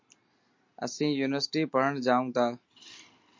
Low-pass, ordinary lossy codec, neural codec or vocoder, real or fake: 7.2 kHz; MP3, 48 kbps; none; real